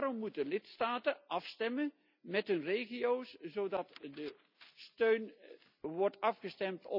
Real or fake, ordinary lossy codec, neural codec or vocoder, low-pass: real; none; none; 5.4 kHz